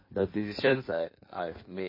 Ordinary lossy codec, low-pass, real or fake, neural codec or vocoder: MP3, 24 kbps; 5.4 kHz; fake; codec, 16 kHz in and 24 kHz out, 1.1 kbps, FireRedTTS-2 codec